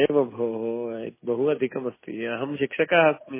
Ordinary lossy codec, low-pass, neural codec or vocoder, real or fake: MP3, 16 kbps; 3.6 kHz; none; real